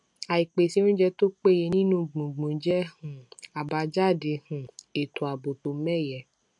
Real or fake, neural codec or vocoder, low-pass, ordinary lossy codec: real; none; 10.8 kHz; MP3, 64 kbps